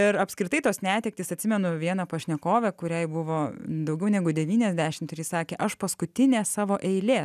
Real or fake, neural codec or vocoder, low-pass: real; none; 14.4 kHz